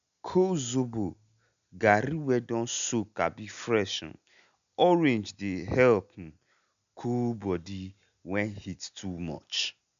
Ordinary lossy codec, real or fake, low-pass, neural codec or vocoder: none; real; 7.2 kHz; none